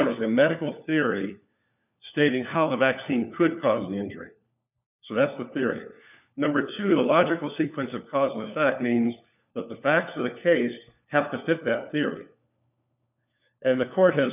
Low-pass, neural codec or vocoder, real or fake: 3.6 kHz; codec, 16 kHz, 4 kbps, FunCodec, trained on LibriTTS, 50 frames a second; fake